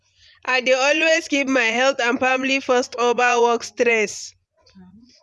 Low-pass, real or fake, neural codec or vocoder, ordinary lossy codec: none; fake; vocoder, 24 kHz, 100 mel bands, Vocos; none